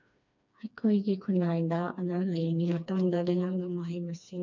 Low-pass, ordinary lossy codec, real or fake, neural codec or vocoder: 7.2 kHz; none; fake; codec, 16 kHz, 2 kbps, FreqCodec, smaller model